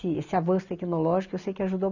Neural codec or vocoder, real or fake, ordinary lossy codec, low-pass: none; real; none; 7.2 kHz